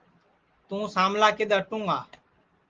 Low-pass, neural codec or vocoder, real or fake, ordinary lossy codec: 7.2 kHz; none; real; Opus, 16 kbps